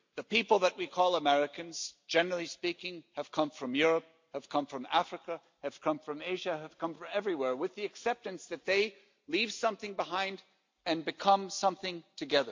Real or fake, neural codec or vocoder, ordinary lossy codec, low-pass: real; none; MP3, 48 kbps; 7.2 kHz